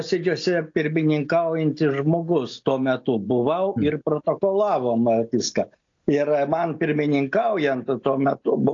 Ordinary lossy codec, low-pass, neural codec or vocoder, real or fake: AAC, 48 kbps; 7.2 kHz; none; real